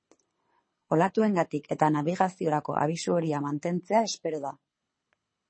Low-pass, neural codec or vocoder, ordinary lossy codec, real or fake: 9.9 kHz; codec, 24 kHz, 6 kbps, HILCodec; MP3, 32 kbps; fake